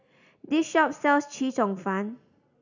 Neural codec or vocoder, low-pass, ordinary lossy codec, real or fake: none; 7.2 kHz; none; real